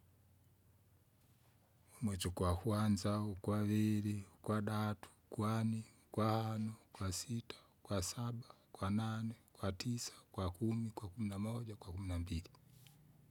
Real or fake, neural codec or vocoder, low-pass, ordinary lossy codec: real; none; 19.8 kHz; none